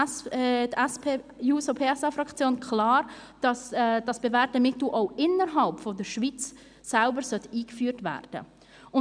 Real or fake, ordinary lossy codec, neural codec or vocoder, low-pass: real; none; none; 9.9 kHz